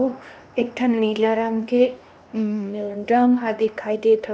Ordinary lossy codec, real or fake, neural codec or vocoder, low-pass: none; fake; codec, 16 kHz, 1 kbps, X-Codec, HuBERT features, trained on LibriSpeech; none